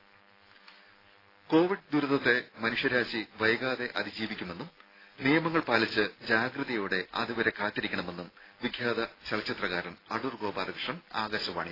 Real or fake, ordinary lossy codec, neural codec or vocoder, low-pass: real; AAC, 24 kbps; none; 5.4 kHz